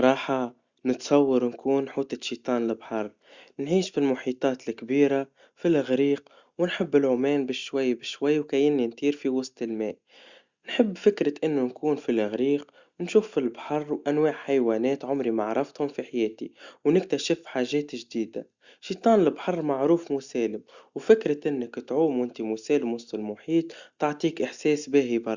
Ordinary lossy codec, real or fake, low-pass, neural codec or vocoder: Opus, 64 kbps; real; 7.2 kHz; none